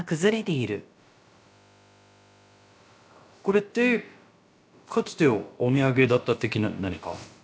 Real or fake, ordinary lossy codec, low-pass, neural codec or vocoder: fake; none; none; codec, 16 kHz, about 1 kbps, DyCAST, with the encoder's durations